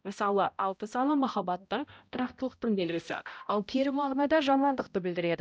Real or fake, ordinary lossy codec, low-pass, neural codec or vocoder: fake; none; none; codec, 16 kHz, 0.5 kbps, X-Codec, HuBERT features, trained on balanced general audio